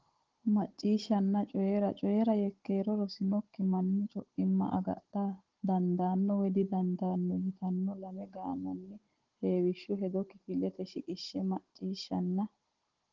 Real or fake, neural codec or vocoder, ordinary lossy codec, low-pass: fake; codec, 16 kHz, 16 kbps, FunCodec, trained on Chinese and English, 50 frames a second; Opus, 16 kbps; 7.2 kHz